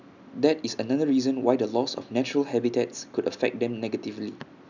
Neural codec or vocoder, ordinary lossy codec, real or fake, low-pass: none; none; real; 7.2 kHz